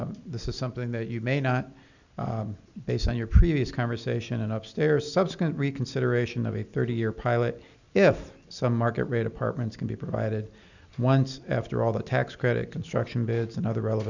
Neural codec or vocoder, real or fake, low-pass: none; real; 7.2 kHz